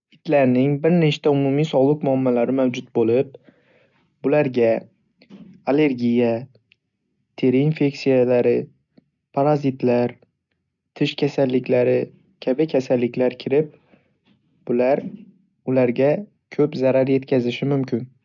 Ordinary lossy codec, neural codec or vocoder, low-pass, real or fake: none; none; 7.2 kHz; real